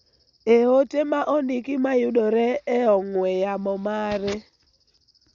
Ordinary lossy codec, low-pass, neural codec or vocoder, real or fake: Opus, 64 kbps; 7.2 kHz; none; real